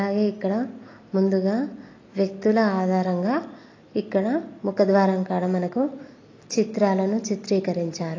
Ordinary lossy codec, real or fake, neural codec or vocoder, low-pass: AAC, 32 kbps; real; none; 7.2 kHz